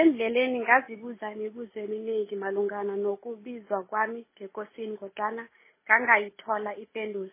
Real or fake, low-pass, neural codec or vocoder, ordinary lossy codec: real; 3.6 kHz; none; MP3, 16 kbps